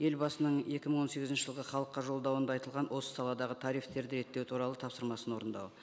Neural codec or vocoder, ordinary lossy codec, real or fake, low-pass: none; none; real; none